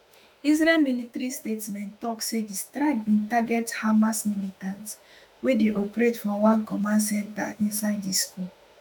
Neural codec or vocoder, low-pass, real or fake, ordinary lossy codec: autoencoder, 48 kHz, 32 numbers a frame, DAC-VAE, trained on Japanese speech; 19.8 kHz; fake; none